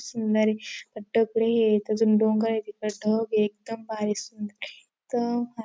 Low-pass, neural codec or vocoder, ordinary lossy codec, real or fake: none; none; none; real